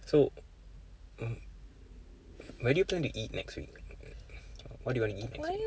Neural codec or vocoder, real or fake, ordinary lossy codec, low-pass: none; real; none; none